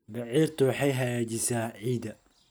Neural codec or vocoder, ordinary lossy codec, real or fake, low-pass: none; none; real; none